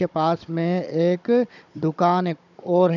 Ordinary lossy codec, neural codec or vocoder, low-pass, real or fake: none; codec, 16 kHz, 16 kbps, FunCodec, trained on Chinese and English, 50 frames a second; 7.2 kHz; fake